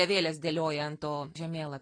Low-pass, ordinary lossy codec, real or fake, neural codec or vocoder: 9.9 kHz; AAC, 32 kbps; real; none